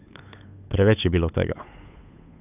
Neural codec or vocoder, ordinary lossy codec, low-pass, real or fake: codec, 16 kHz, 8 kbps, FunCodec, trained on Chinese and English, 25 frames a second; none; 3.6 kHz; fake